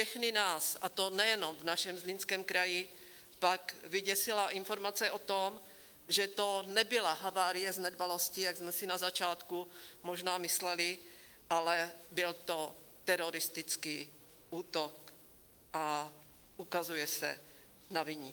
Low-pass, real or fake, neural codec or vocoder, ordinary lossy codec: 19.8 kHz; fake; autoencoder, 48 kHz, 128 numbers a frame, DAC-VAE, trained on Japanese speech; Opus, 32 kbps